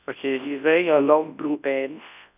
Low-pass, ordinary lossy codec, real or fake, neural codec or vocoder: 3.6 kHz; none; fake; codec, 24 kHz, 0.9 kbps, WavTokenizer, large speech release